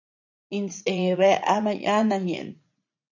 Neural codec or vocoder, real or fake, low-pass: vocoder, 44.1 kHz, 80 mel bands, Vocos; fake; 7.2 kHz